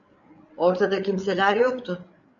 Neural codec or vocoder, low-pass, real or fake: codec, 16 kHz, 8 kbps, FreqCodec, larger model; 7.2 kHz; fake